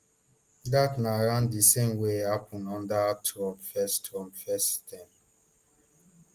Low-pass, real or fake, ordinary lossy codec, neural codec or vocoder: 14.4 kHz; real; Opus, 24 kbps; none